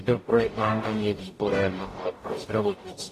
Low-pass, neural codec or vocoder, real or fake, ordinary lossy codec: 14.4 kHz; codec, 44.1 kHz, 0.9 kbps, DAC; fake; AAC, 48 kbps